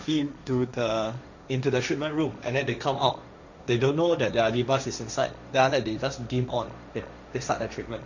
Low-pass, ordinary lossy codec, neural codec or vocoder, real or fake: 7.2 kHz; none; codec, 16 kHz, 1.1 kbps, Voila-Tokenizer; fake